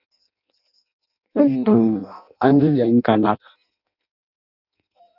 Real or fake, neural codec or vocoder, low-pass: fake; codec, 16 kHz in and 24 kHz out, 0.6 kbps, FireRedTTS-2 codec; 5.4 kHz